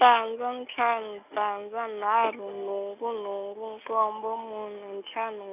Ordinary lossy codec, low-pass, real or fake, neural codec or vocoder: none; 3.6 kHz; real; none